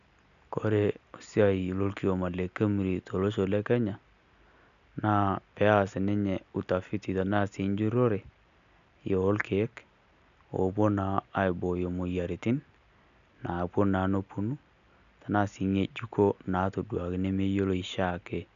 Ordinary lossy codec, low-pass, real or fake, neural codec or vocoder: none; 7.2 kHz; real; none